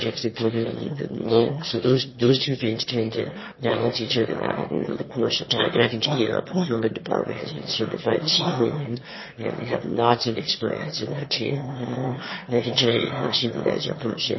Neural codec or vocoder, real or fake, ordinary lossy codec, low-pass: autoencoder, 22.05 kHz, a latent of 192 numbers a frame, VITS, trained on one speaker; fake; MP3, 24 kbps; 7.2 kHz